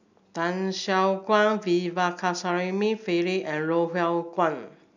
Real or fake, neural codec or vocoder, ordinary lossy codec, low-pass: real; none; none; 7.2 kHz